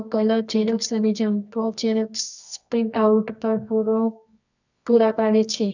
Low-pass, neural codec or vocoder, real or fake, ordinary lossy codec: 7.2 kHz; codec, 24 kHz, 0.9 kbps, WavTokenizer, medium music audio release; fake; none